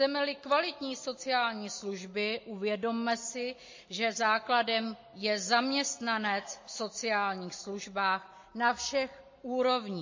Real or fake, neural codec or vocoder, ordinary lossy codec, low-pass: real; none; MP3, 32 kbps; 7.2 kHz